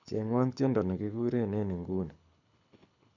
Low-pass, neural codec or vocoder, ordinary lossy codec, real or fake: 7.2 kHz; codec, 24 kHz, 6 kbps, HILCodec; none; fake